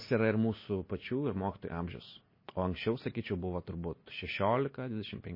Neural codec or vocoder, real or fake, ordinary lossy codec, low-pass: none; real; MP3, 24 kbps; 5.4 kHz